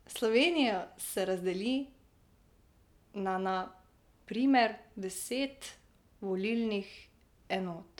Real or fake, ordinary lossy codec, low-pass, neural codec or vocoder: real; none; 19.8 kHz; none